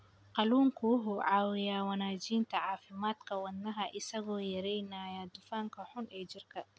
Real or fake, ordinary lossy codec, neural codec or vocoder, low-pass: real; none; none; none